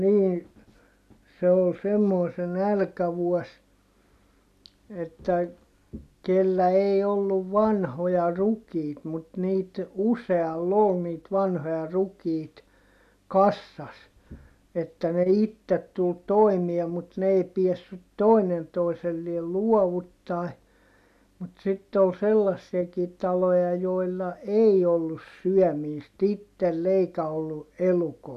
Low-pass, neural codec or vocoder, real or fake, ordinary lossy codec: 14.4 kHz; none; real; none